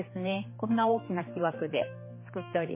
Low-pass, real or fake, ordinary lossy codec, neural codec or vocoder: 3.6 kHz; fake; MP3, 16 kbps; codec, 16 kHz, 4 kbps, X-Codec, HuBERT features, trained on general audio